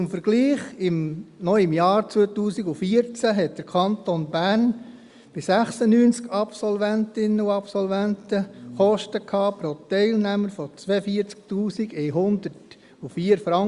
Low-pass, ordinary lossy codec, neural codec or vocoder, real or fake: 10.8 kHz; Opus, 64 kbps; none; real